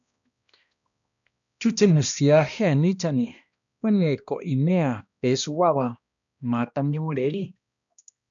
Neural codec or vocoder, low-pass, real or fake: codec, 16 kHz, 1 kbps, X-Codec, HuBERT features, trained on balanced general audio; 7.2 kHz; fake